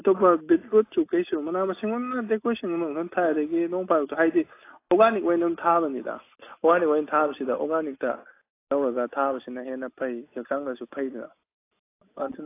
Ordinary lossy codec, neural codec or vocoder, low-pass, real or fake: AAC, 24 kbps; none; 3.6 kHz; real